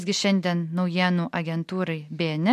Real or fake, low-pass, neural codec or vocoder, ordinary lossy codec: real; 14.4 kHz; none; MP3, 64 kbps